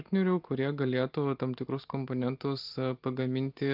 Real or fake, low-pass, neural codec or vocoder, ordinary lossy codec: real; 5.4 kHz; none; Opus, 24 kbps